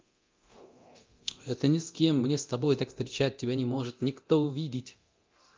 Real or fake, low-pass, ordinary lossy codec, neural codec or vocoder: fake; 7.2 kHz; Opus, 24 kbps; codec, 24 kHz, 0.9 kbps, DualCodec